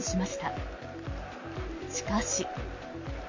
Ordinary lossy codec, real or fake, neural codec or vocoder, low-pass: MP3, 32 kbps; real; none; 7.2 kHz